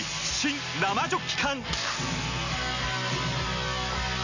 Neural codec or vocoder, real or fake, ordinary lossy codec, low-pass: none; real; none; 7.2 kHz